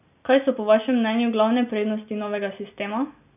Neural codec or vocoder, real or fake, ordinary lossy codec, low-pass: none; real; none; 3.6 kHz